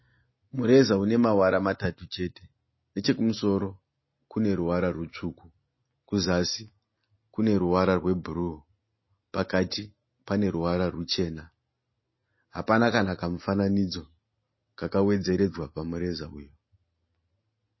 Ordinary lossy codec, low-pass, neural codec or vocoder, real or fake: MP3, 24 kbps; 7.2 kHz; none; real